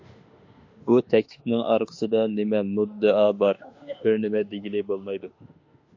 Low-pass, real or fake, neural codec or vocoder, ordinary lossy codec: 7.2 kHz; fake; autoencoder, 48 kHz, 32 numbers a frame, DAC-VAE, trained on Japanese speech; AAC, 48 kbps